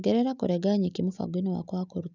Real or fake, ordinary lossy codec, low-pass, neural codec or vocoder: real; none; 7.2 kHz; none